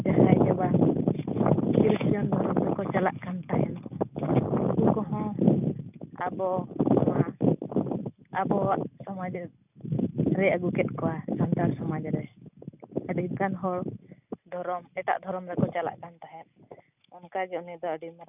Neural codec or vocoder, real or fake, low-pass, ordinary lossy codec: none; real; 3.6 kHz; none